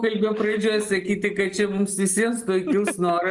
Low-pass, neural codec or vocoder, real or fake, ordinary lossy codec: 10.8 kHz; none; real; Opus, 24 kbps